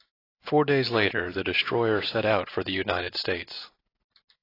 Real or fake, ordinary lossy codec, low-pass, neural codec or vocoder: real; AAC, 24 kbps; 5.4 kHz; none